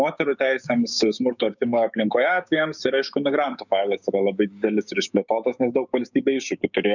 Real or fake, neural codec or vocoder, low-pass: fake; codec, 16 kHz, 6 kbps, DAC; 7.2 kHz